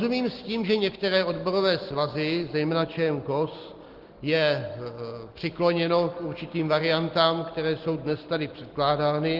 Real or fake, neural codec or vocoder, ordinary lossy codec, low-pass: real; none; Opus, 16 kbps; 5.4 kHz